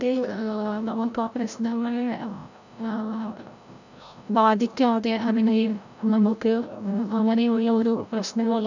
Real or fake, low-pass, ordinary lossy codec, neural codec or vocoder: fake; 7.2 kHz; none; codec, 16 kHz, 0.5 kbps, FreqCodec, larger model